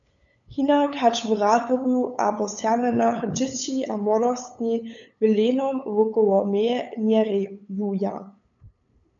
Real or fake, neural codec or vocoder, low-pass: fake; codec, 16 kHz, 8 kbps, FunCodec, trained on LibriTTS, 25 frames a second; 7.2 kHz